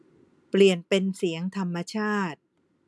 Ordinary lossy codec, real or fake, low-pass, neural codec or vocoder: none; real; none; none